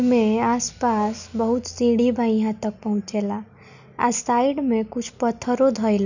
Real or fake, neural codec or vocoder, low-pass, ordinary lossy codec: real; none; 7.2 kHz; none